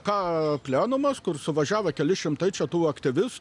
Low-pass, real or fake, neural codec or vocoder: 10.8 kHz; real; none